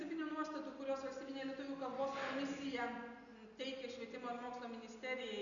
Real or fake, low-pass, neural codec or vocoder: real; 7.2 kHz; none